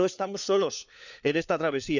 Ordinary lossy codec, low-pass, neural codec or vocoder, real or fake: none; 7.2 kHz; codec, 16 kHz, 4 kbps, FunCodec, trained on LibriTTS, 50 frames a second; fake